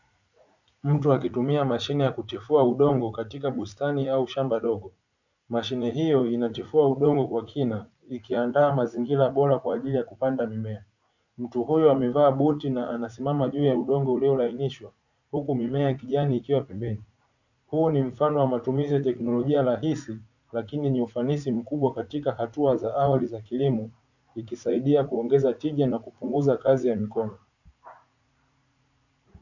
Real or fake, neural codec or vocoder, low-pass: fake; vocoder, 44.1 kHz, 80 mel bands, Vocos; 7.2 kHz